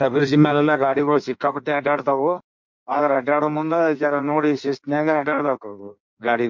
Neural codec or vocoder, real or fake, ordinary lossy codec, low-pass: codec, 16 kHz in and 24 kHz out, 1.1 kbps, FireRedTTS-2 codec; fake; MP3, 64 kbps; 7.2 kHz